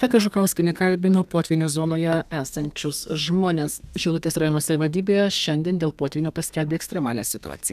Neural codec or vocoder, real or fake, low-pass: codec, 32 kHz, 1.9 kbps, SNAC; fake; 14.4 kHz